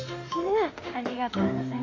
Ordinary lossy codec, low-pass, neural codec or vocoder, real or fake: AAC, 32 kbps; 7.2 kHz; autoencoder, 48 kHz, 32 numbers a frame, DAC-VAE, trained on Japanese speech; fake